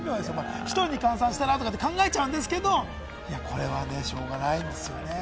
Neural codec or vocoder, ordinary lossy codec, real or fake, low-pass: none; none; real; none